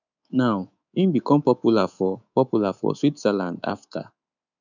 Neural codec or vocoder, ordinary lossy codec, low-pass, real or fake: codec, 24 kHz, 3.1 kbps, DualCodec; none; 7.2 kHz; fake